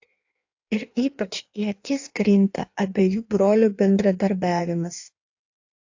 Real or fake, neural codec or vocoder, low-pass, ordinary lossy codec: fake; codec, 16 kHz in and 24 kHz out, 1.1 kbps, FireRedTTS-2 codec; 7.2 kHz; AAC, 48 kbps